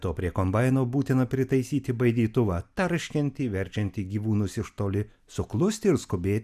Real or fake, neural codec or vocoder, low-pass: real; none; 14.4 kHz